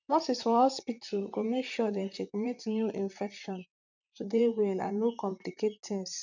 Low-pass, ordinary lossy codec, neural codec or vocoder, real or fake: 7.2 kHz; none; vocoder, 44.1 kHz, 128 mel bands, Pupu-Vocoder; fake